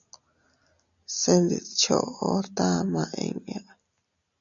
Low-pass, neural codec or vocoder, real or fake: 7.2 kHz; none; real